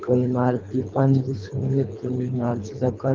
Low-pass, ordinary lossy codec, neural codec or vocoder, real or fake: 7.2 kHz; Opus, 32 kbps; codec, 24 kHz, 3 kbps, HILCodec; fake